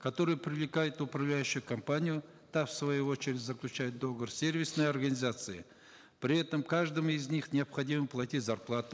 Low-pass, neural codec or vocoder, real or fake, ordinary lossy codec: none; none; real; none